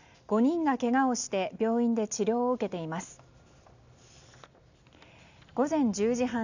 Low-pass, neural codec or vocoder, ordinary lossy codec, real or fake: 7.2 kHz; none; none; real